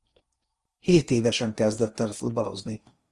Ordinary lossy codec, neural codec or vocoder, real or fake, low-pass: Opus, 32 kbps; codec, 16 kHz in and 24 kHz out, 0.8 kbps, FocalCodec, streaming, 65536 codes; fake; 10.8 kHz